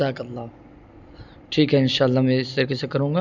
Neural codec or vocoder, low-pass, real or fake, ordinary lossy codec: none; 7.2 kHz; real; none